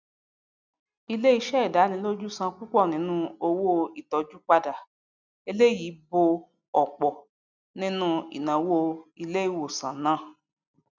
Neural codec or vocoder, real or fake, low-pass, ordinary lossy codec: none; real; 7.2 kHz; none